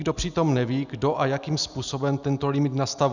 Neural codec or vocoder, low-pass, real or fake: none; 7.2 kHz; real